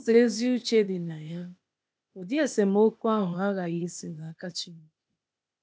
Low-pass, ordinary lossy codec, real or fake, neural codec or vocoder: none; none; fake; codec, 16 kHz, 0.8 kbps, ZipCodec